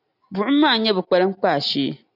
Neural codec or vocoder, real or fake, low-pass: none; real; 5.4 kHz